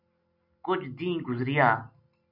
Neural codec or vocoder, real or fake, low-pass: none; real; 5.4 kHz